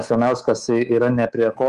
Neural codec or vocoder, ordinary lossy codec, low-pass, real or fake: codec, 24 kHz, 3.1 kbps, DualCodec; Opus, 24 kbps; 10.8 kHz; fake